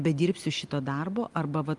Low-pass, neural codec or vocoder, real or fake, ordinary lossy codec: 10.8 kHz; none; real; Opus, 32 kbps